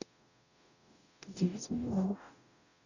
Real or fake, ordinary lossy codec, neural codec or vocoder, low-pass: fake; none; codec, 44.1 kHz, 0.9 kbps, DAC; 7.2 kHz